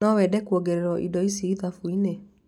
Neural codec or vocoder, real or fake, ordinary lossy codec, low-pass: none; real; none; 19.8 kHz